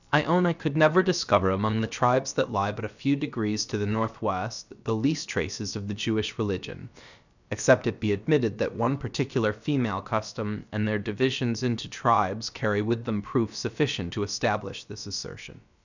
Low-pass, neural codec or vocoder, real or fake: 7.2 kHz; codec, 16 kHz, about 1 kbps, DyCAST, with the encoder's durations; fake